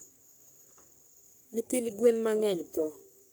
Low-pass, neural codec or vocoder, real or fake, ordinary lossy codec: none; codec, 44.1 kHz, 3.4 kbps, Pupu-Codec; fake; none